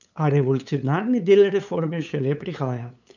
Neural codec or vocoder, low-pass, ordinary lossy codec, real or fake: codec, 16 kHz, 8 kbps, FunCodec, trained on LibriTTS, 25 frames a second; 7.2 kHz; none; fake